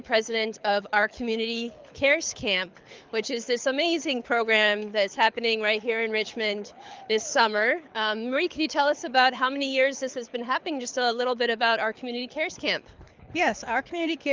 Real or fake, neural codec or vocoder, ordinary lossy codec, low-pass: fake; codec, 24 kHz, 6 kbps, HILCodec; Opus, 24 kbps; 7.2 kHz